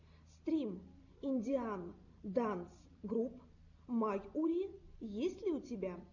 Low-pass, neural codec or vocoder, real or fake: 7.2 kHz; none; real